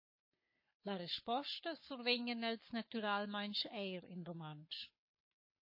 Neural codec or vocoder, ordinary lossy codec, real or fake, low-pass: codec, 44.1 kHz, 7.8 kbps, Pupu-Codec; MP3, 24 kbps; fake; 5.4 kHz